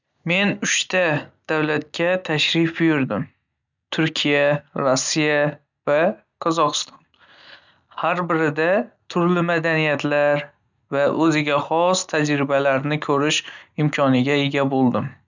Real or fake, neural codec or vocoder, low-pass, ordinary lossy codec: real; none; 7.2 kHz; none